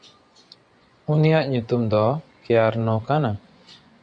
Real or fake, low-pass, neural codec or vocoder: fake; 9.9 kHz; vocoder, 24 kHz, 100 mel bands, Vocos